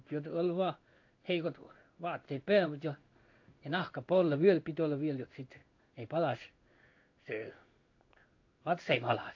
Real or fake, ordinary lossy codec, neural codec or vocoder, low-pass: fake; AAC, 48 kbps; codec, 16 kHz in and 24 kHz out, 1 kbps, XY-Tokenizer; 7.2 kHz